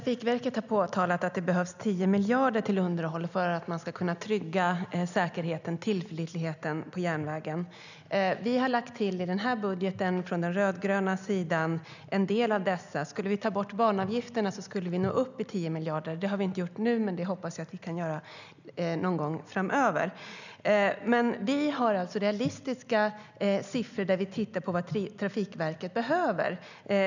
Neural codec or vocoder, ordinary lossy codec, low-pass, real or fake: none; none; 7.2 kHz; real